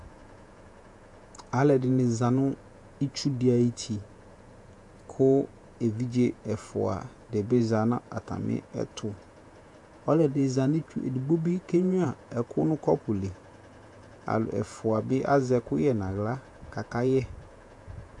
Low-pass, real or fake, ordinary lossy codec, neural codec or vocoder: 10.8 kHz; fake; AAC, 64 kbps; vocoder, 48 kHz, 128 mel bands, Vocos